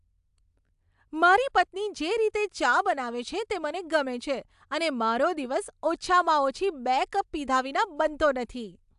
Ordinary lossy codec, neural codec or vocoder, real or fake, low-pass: AAC, 96 kbps; none; real; 10.8 kHz